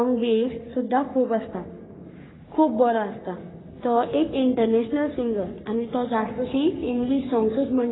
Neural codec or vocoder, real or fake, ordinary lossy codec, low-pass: codec, 44.1 kHz, 3.4 kbps, Pupu-Codec; fake; AAC, 16 kbps; 7.2 kHz